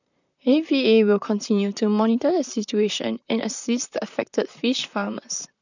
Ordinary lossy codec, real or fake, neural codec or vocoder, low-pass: none; fake; vocoder, 44.1 kHz, 128 mel bands, Pupu-Vocoder; 7.2 kHz